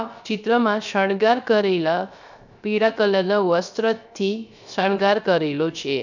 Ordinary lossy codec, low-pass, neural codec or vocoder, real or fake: none; 7.2 kHz; codec, 16 kHz, 0.3 kbps, FocalCodec; fake